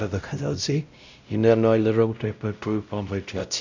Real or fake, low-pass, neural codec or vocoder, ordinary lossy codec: fake; 7.2 kHz; codec, 16 kHz, 0.5 kbps, X-Codec, WavLM features, trained on Multilingual LibriSpeech; none